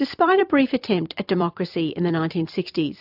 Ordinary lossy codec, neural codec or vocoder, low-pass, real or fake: AAC, 48 kbps; vocoder, 44.1 kHz, 128 mel bands every 256 samples, BigVGAN v2; 5.4 kHz; fake